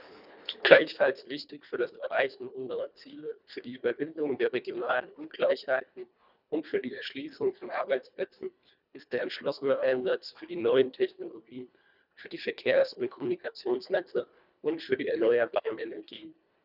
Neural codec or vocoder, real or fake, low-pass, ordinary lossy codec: codec, 24 kHz, 1.5 kbps, HILCodec; fake; 5.4 kHz; none